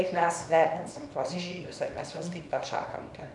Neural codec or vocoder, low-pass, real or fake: codec, 24 kHz, 0.9 kbps, WavTokenizer, small release; 10.8 kHz; fake